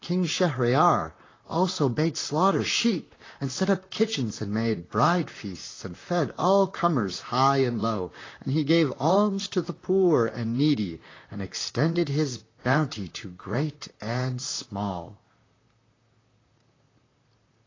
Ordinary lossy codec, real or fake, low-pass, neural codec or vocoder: AAC, 32 kbps; fake; 7.2 kHz; vocoder, 44.1 kHz, 128 mel bands, Pupu-Vocoder